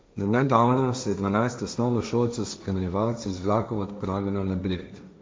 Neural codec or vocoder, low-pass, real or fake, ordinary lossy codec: codec, 16 kHz, 1.1 kbps, Voila-Tokenizer; none; fake; none